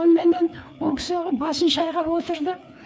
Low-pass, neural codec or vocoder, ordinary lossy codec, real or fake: none; codec, 16 kHz, 4 kbps, FreqCodec, larger model; none; fake